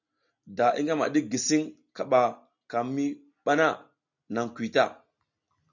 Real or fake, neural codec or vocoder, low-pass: real; none; 7.2 kHz